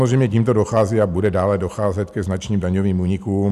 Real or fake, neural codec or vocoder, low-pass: real; none; 14.4 kHz